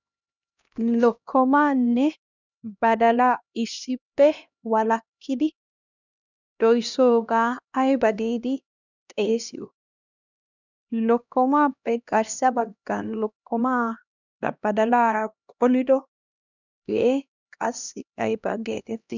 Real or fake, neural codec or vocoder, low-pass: fake; codec, 16 kHz, 1 kbps, X-Codec, HuBERT features, trained on LibriSpeech; 7.2 kHz